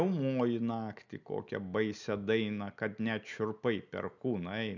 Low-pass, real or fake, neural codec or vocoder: 7.2 kHz; real; none